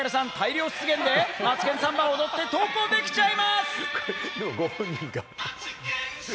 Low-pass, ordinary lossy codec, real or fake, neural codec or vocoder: none; none; real; none